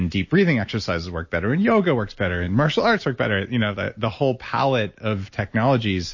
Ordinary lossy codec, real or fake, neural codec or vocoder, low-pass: MP3, 32 kbps; real; none; 7.2 kHz